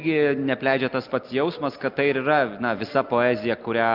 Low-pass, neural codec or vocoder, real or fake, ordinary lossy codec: 5.4 kHz; none; real; Opus, 24 kbps